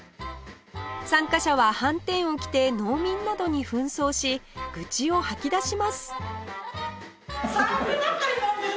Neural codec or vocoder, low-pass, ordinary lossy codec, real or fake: none; none; none; real